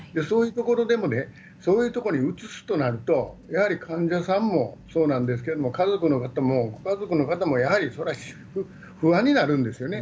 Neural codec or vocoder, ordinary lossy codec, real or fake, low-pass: none; none; real; none